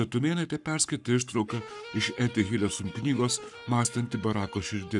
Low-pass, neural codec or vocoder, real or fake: 10.8 kHz; codec, 44.1 kHz, 7.8 kbps, Pupu-Codec; fake